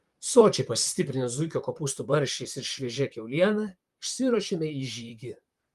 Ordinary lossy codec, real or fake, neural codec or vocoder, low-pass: Opus, 32 kbps; fake; vocoder, 44.1 kHz, 128 mel bands, Pupu-Vocoder; 14.4 kHz